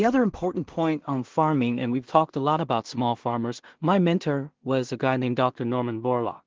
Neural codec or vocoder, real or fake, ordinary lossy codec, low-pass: codec, 16 kHz in and 24 kHz out, 0.4 kbps, LongCat-Audio-Codec, two codebook decoder; fake; Opus, 16 kbps; 7.2 kHz